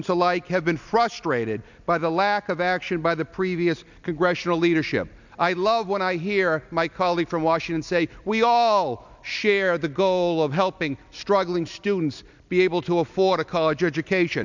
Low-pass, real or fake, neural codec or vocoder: 7.2 kHz; real; none